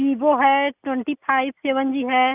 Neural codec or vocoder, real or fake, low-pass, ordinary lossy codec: none; real; 3.6 kHz; none